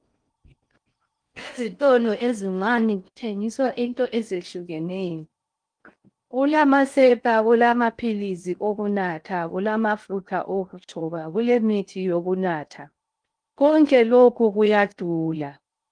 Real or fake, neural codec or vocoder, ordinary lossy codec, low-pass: fake; codec, 16 kHz in and 24 kHz out, 0.6 kbps, FocalCodec, streaming, 4096 codes; Opus, 32 kbps; 9.9 kHz